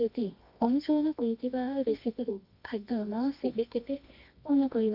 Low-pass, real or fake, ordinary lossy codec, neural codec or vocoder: 5.4 kHz; fake; none; codec, 24 kHz, 0.9 kbps, WavTokenizer, medium music audio release